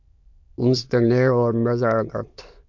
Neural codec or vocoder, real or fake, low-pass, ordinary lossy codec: autoencoder, 22.05 kHz, a latent of 192 numbers a frame, VITS, trained on many speakers; fake; 7.2 kHz; MP3, 48 kbps